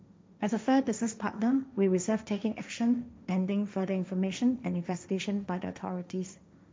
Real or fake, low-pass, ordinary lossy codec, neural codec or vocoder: fake; none; none; codec, 16 kHz, 1.1 kbps, Voila-Tokenizer